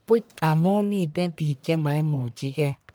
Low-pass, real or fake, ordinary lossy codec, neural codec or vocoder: none; fake; none; codec, 44.1 kHz, 1.7 kbps, Pupu-Codec